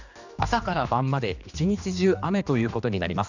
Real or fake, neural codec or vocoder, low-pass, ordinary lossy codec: fake; codec, 16 kHz, 2 kbps, X-Codec, HuBERT features, trained on general audio; 7.2 kHz; none